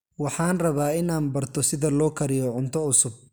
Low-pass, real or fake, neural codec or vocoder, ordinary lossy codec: none; real; none; none